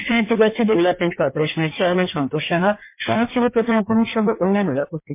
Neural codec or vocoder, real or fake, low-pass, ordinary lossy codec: codec, 16 kHz in and 24 kHz out, 1.1 kbps, FireRedTTS-2 codec; fake; 3.6 kHz; MP3, 24 kbps